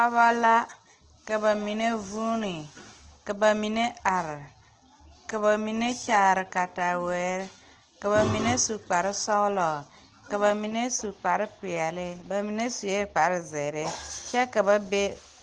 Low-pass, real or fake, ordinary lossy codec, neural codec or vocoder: 9.9 kHz; real; Opus, 16 kbps; none